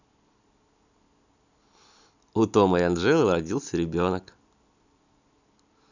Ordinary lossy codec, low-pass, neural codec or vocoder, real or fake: none; 7.2 kHz; none; real